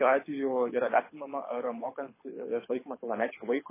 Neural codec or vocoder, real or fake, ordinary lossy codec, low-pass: codec, 24 kHz, 6 kbps, HILCodec; fake; MP3, 16 kbps; 3.6 kHz